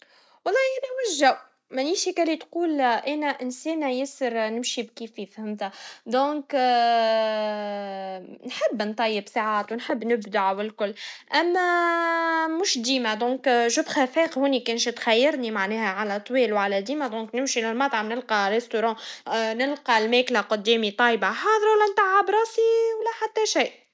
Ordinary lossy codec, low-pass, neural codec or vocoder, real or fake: none; none; none; real